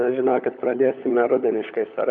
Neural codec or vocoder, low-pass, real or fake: codec, 16 kHz, 16 kbps, FunCodec, trained on LibriTTS, 50 frames a second; 7.2 kHz; fake